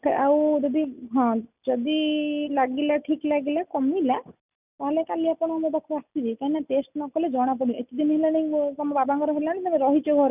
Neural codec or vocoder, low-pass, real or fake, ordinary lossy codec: none; 3.6 kHz; real; none